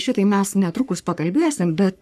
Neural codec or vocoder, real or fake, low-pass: codec, 44.1 kHz, 3.4 kbps, Pupu-Codec; fake; 14.4 kHz